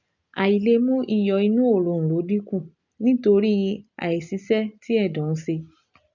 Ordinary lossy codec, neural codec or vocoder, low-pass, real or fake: none; none; 7.2 kHz; real